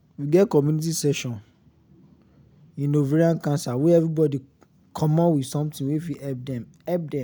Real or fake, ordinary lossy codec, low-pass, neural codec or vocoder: real; none; none; none